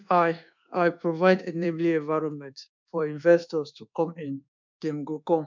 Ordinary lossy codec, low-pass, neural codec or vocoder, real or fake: MP3, 64 kbps; 7.2 kHz; codec, 24 kHz, 1.2 kbps, DualCodec; fake